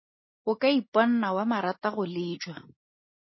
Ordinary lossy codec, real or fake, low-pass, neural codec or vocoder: MP3, 24 kbps; real; 7.2 kHz; none